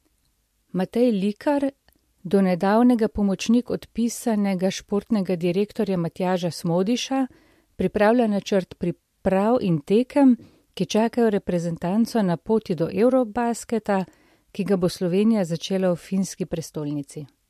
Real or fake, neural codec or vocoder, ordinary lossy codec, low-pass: real; none; MP3, 64 kbps; 14.4 kHz